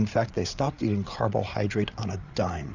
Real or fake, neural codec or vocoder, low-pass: fake; codec, 16 kHz, 8 kbps, FreqCodec, larger model; 7.2 kHz